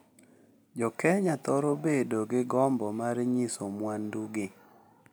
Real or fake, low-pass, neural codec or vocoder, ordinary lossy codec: real; none; none; none